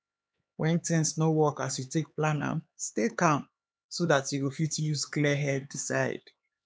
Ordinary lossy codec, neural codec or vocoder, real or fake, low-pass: none; codec, 16 kHz, 4 kbps, X-Codec, HuBERT features, trained on LibriSpeech; fake; none